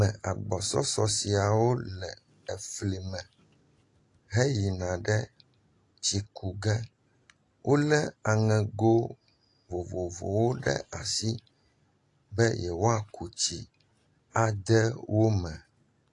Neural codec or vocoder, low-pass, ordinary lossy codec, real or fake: none; 10.8 kHz; AAC, 48 kbps; real